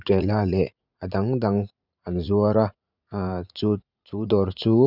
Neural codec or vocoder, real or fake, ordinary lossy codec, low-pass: vocoder, 44.1 kHz, 80 mel bands, Vocos; fake; none; 5.4 kHz